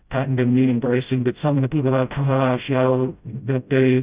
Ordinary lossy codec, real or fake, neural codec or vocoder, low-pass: Opus, 64 kbps; fake; codec, 16 kHz, 0.5 kbps, FreqCodec, smaller model; 3.6 kHz